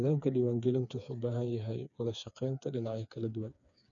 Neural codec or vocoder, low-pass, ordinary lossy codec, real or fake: codec, 16 kHz, 4 kbps, FreqCodec, smaller model; 7.2 kHz; none; fake